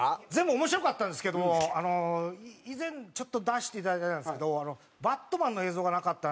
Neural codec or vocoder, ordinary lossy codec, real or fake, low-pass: none; none; real; none